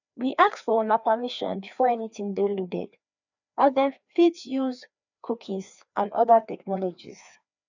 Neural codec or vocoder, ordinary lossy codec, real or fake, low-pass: codec, 16 kHz, 2 kbps, FreqCodec, larger model; none; fake; 7.2 kHz